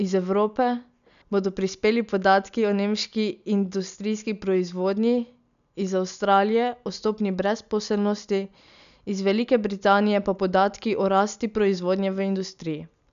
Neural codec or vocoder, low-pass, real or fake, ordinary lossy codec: none; 7.2 kHz; real; none